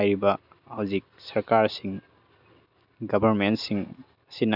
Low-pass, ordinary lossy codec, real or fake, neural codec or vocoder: 5.4 kHz; none; real; none